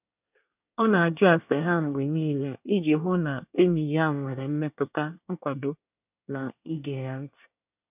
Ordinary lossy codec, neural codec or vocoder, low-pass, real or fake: none; codec, 24 kHz, 1 kbps, SNAC; 3.6 kHz; fake